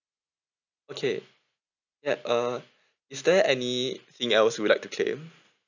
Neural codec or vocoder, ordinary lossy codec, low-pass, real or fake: none; none; 7.2 kHz; real